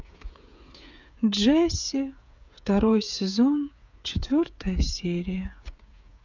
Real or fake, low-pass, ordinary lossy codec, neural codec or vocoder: fake; 7.2 kHz; none; codec, 16 kHz, 8 kbps, FreqCodec, smaller model